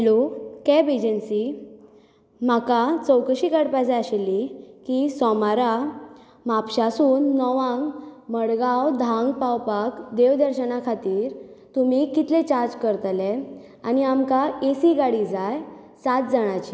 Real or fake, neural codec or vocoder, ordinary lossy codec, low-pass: real; none; none; none